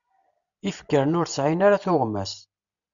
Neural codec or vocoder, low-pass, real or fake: none; 7.2 kHz; real